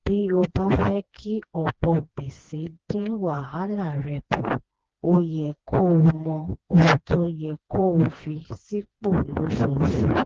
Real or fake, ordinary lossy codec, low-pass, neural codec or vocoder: fake; Opus, 16 kbps; 7.2 kHz; codec, 16 kHz, 2 kbps, FreqCodec, smaller model